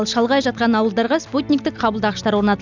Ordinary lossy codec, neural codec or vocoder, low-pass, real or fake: none; none; 7.2 kHz; real